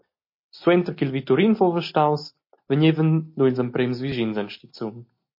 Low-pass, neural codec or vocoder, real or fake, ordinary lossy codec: 5.4 kHz; none; real; MP3, 32 kbps